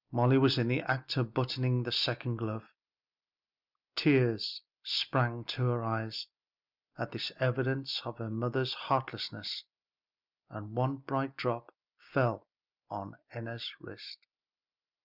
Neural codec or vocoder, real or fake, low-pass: none; real; 5.4 kHz